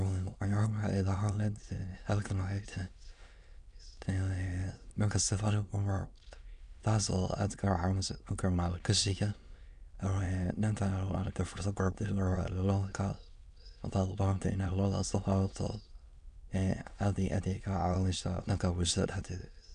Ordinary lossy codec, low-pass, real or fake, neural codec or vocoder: none; 9.9 kHz; fake; autoencoder, 22.05 kHz, a latent of 192 numbers a frame, VITS, trained on many speakers